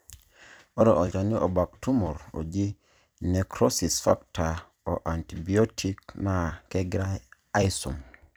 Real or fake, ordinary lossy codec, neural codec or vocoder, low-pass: fake; none; vocoder, 44.1 kHz, 128 mel bands every 256 samples, BigVGAN v2; none